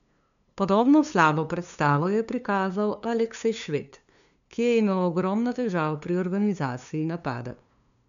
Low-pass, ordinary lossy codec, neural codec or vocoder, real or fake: 7.2 kHz; none; codec, 16 kHz, 2 kbps, FunCodec, trained on LibriTTS, 25 frames a second; fake